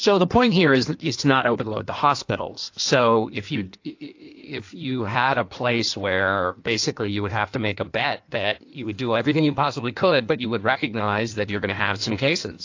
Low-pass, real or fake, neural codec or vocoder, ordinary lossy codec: 7.2 kHz; fake; codec, 16 kHz in and 24 kHz out, 1.1 kbps, FireRedTTS-2 codec; AAC, 48 kbps